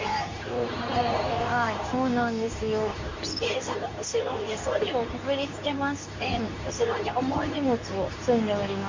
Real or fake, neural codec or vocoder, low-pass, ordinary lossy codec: fake; codec, 24 kHz, 0.9 kbps, WavTokenizer, medium speech release version 2; 7.2 kHz; MP3, 48 kbps